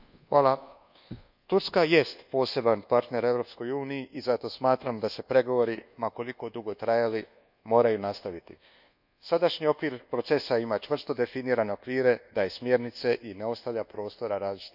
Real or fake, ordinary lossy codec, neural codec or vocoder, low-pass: fake; none; codec, 24 kHz, 1.2 kbps, DualCodec; 5.4 kHz